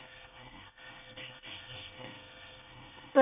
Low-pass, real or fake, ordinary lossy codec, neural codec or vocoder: 3.6 kHz; fake; AAC, 32 kbps; codec, 24 kHz, 1 kbps, SNAC